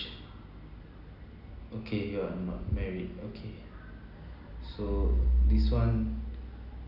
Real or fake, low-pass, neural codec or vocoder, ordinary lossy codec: real; 5.4 kHz; none; Opus, 64 kbps